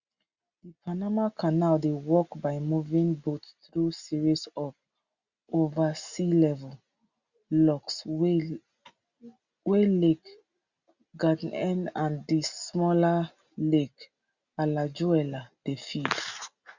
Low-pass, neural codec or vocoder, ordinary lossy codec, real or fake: 7.2 kHz; none; Opus, 64 kbps; real